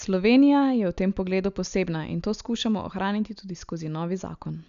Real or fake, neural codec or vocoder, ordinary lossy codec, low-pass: real; none; none; 7.2 kHz